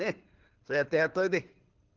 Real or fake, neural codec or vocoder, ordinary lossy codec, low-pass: fake; codec, 24 kHz, 6 kbps, HILCodec; Opus, 16 kbps; 7.2 kHz